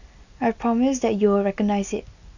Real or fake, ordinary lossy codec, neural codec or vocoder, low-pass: real; none; none; 7.2 kHz